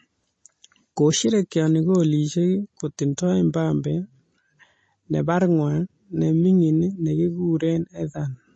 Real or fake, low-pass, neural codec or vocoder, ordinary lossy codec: real; 9.9 kHz; none; MP3, 32 kbps